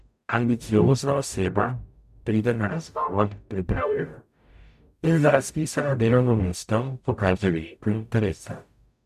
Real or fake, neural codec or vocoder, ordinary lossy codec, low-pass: fake; codec, 44.1 kHz, 0.9 kbps, DAC; none; 14.4 kHz